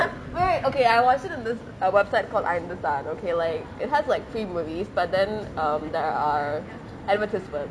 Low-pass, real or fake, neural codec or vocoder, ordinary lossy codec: none; real; none; none